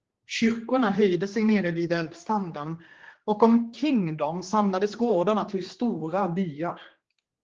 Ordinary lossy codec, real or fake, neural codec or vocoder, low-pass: Opus, 16 kbps; fake; codec, 16 kHz, 2 kbps, X-Codec, HuBERT features, trained on general audio; 7.2 kHz